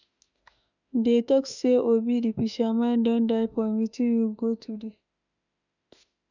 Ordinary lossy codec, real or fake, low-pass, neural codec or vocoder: none; fake; 7.2 kHz; autoencoder, 48 kHz, 32 numbers a frame, DAC-VAE, trained on Japanese speech